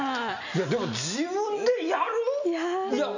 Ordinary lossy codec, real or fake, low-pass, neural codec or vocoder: none; real; 7.2 kHz; none